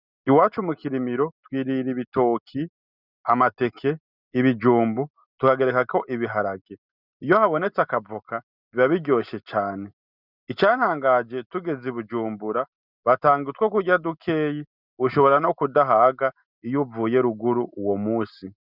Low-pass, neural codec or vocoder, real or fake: 5.4 kHz; none; real